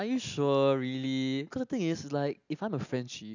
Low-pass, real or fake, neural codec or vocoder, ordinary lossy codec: 7.2 kHz; real; none; none